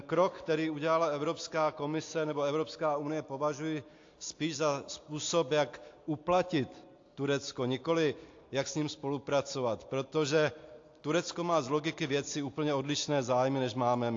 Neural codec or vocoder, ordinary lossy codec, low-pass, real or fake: none; AAC, 48 kbps; 7.2 kHz; real